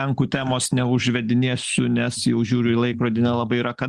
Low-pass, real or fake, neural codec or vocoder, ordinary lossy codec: 10.8 kHz; real; none; Opus, 24 kbps